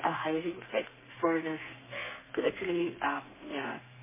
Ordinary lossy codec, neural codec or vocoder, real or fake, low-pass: MP3, 16 kbps; codec, 32 kHz, 1.9 kbps, SNAC; fake; 3.6 kHz